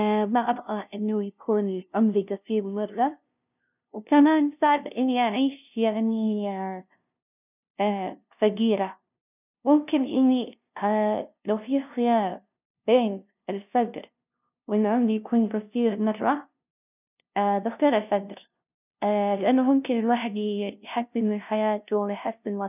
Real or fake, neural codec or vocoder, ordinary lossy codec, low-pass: fake; codec, 16 kHz, 0.5 kbps, FunCodec, trained on LibriTTS, 25 frames a second; none; 3.6 kHz